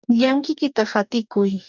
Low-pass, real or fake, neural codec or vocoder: 7.2 kHz; fake; codec, 44.1 kHz, 2.6 kbps, DAC